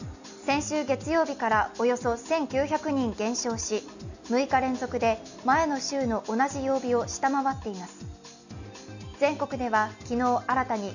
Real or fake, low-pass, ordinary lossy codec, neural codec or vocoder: real; 7.2 kHz; none; none